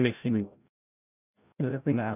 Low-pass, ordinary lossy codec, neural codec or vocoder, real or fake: 3.6 kHz; none; codec, 16 kHz, 0.5 kbps, FreqCodec, larger model; fake